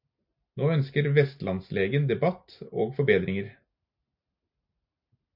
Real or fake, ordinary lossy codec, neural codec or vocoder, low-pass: real; MP3, 48 kbps; none; 5.4 kHz